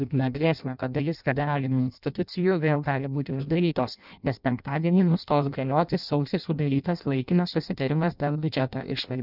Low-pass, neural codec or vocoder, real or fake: 5.4 kHz; codec, 16 kHz in and 24 kHz out, 0.6 kbps, FireRedTTS-2 codec; fake